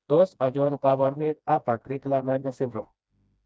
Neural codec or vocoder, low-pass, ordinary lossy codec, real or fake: codec, 16 kHz, 1 kbps, FreqCodec, smaller model; none; none; fake